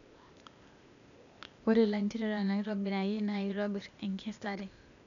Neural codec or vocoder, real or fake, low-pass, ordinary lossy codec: codec, 16 kHz, 0.8 kbps, ZipCodec; fake; 7.2 kHz; none